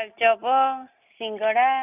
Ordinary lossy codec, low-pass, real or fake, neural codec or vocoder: none; 3.6 kHz; real; none